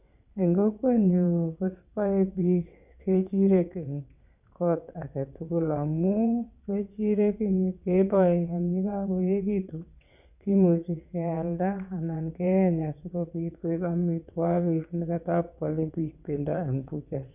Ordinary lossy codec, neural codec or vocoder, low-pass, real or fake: none; vocoder, 22.05 kHz, 80 mel bands, WaveNeXt; 3.6 kHz; fake